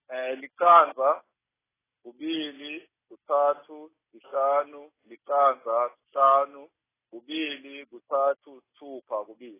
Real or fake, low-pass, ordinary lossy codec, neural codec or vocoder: real; 3.6 kHz; AAC, 16 kbps; none